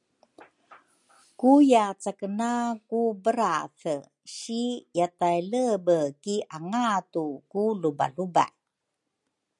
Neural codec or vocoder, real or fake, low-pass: none; real; 10.8 kHz